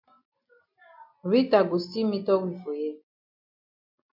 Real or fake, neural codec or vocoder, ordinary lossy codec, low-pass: real; none; MP3, 48 kbps; 5.4 kHz